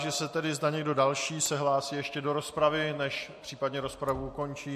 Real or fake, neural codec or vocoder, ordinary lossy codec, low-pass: real; none; MP3, 64 kbps; 14.4 kHz